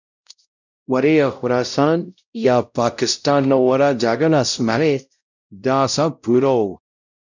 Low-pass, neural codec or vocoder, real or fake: 7.2 kHz; codec, 16 kHz, 0.5 kbps, X-Codec, WavLM features, trained on Multilingual LibriSpeech; fake